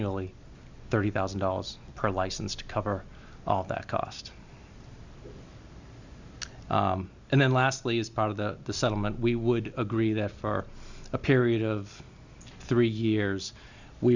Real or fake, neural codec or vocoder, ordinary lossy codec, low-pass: real; none; Opus, 64 kbps; 7.2 kHz